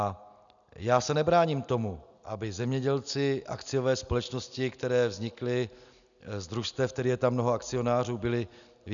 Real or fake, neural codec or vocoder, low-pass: real; none; 7.2 kHz